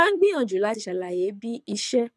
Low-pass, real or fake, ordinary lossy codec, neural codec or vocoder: none; fake; none; codec, 24 kHz, 6 kbps, HILCodec